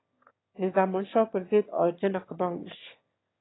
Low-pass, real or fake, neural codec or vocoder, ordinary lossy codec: 7.2 kHz; fake; autoencoder, 22.05 kHz, a latent of 192 numbers a frame, VITS, trained on one speaker; AAC, 16 kbps